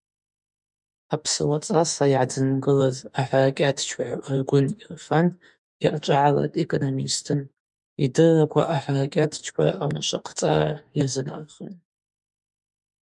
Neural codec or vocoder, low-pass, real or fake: autoencoder, 48 kHz, 32 numbers a frame, DAC-VAE, trained on Japanese speech; 10.8 kHz; fake